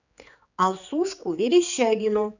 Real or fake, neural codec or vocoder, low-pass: fake; codec, 16 kHz, 4 kbps, X-Codec, HuBERT features, trained on general audio; 7.2 kHz